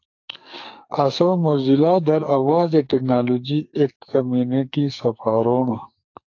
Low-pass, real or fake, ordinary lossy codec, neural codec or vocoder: 7.2 kHz; fake; AAC, 48 kbps; codec, 44.1 kHz, 2.6 kbps, SNAC